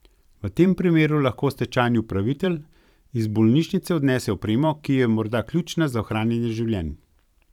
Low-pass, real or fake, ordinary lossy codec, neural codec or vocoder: 19.8 kHz; fake; none; vocoder, 44.1 kHz, 128 mel bands every 512 samples, BigVGAN v2